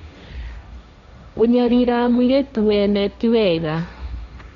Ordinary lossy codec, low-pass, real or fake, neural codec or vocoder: Opus, 64 kbps; 7.2 kHz; fake; codec, 16 kHz, 1.1 kbps, Voila-Tokenizer